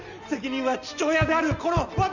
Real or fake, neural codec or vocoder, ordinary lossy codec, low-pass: real; none; none; 7.2 kHz